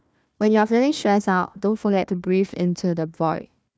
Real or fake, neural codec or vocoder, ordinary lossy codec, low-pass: fake; codec, 16 kHz, 1 kbps, FunCodec, trained on Chinese and English, 50 frames a second; none; none